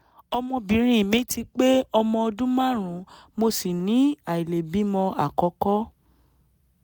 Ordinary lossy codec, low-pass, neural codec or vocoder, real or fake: none; none; none; real